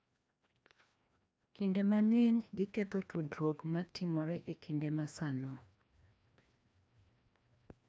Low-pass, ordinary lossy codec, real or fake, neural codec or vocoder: none; none; fake; codec, 16 kHz, 1 kbps, FreqCodec, larger model